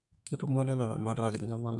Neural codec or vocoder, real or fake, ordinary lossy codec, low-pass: codec, 32 kHz, 1.9 kbps, SNAC; fake; none; 10.8 kHz